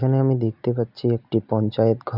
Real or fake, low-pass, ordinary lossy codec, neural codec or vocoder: real; 5.4 kHz; none; none